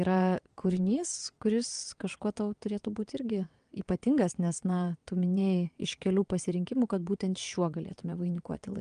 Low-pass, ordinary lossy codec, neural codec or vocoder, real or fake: 9.9 kHz; Opus, 32 kbps; vocoder, 22.05 kHz, 80 mel bands, WaveNeXt; fake